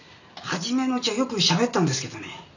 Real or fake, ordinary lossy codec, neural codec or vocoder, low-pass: real; none; none; 7.2 kHz